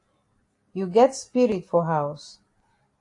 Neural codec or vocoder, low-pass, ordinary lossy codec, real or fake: none; 10.8 kHz; AAC, 48 kbps; real